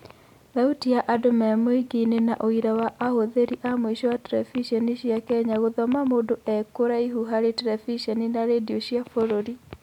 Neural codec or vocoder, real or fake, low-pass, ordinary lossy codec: none; real; 19.8 kHz; none